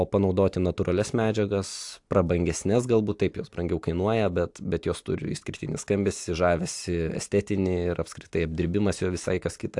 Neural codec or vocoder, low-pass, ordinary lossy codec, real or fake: none; 10.8 kHz; AAC, 64 kbps; real